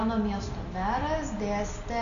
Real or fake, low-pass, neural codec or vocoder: real; 7.2 kHz; none